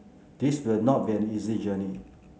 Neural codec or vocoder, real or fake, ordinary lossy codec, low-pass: none; real; none; none